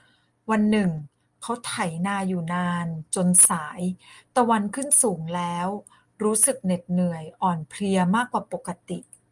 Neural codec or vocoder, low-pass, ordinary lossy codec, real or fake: none; 10.8 kHz; Opus, 32 kbps; real